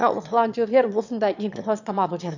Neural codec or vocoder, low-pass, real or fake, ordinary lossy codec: autoencoder, 22.05 kHz, a latent of 192 numbers a frame, VITS, trained on one speaker; 7.2 kHz; fake; none